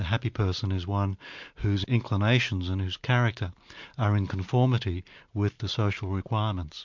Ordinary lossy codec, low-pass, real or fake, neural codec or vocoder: MP3, 64 kbps; 7.2 kHz; real; none